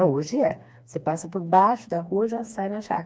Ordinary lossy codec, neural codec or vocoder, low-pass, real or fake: none; codec, 16 kHz, 2 kbps, FreqCodec, smaller model; none; fake